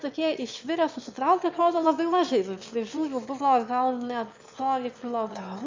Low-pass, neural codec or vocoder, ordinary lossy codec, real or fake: 7.2 kHz; autoencoder, 22.05 kHz, a latent of 192 numbers a frame, VITS, trained on one speaker; MP3, 64 kbps; fake